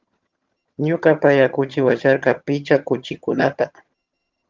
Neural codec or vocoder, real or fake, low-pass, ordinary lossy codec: vocoder, 22.05 kHz, 80 mel bands, HiFi-GAN; fake; 7.2 kHz; Opus, 32 kbps